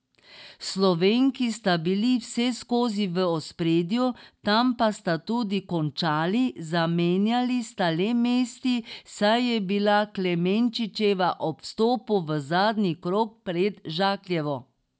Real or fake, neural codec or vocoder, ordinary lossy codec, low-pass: real; none; none; none